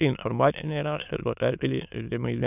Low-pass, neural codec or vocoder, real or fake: 3.6 kHz; autoencoder, 22.05 kHz, a latent of 192 numbers a frame, VITS, trained on many speakers; fake